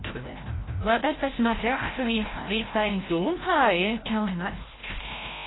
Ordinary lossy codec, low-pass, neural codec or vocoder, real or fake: AAC, 16 kbps; 7.2 kHz; codec, 16 kHz, 0.5 kbps, FreqCodec, larger model; fake